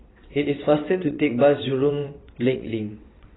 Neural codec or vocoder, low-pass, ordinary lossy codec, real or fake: vocoder, 22.05 kHz, 80 mel bands, WaveNeXt; 7.2 kHz; AAC, 16 kbps; fake